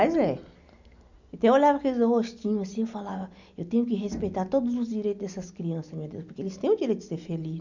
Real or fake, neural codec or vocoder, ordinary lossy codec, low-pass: real; none; none; 7.2 kHz